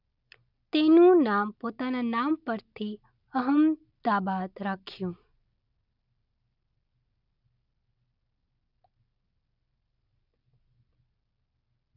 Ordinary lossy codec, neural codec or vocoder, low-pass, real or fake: none; none; 5.4 kHz; real